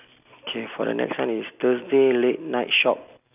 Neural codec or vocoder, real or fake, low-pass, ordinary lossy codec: none; real; 3.6 kHz; none